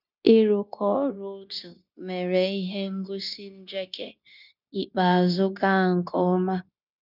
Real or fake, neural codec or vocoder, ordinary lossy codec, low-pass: fake; codec, 16 kHz, 0.9 kbps, LongCat-Audio-Codec; none; 5.4 kHz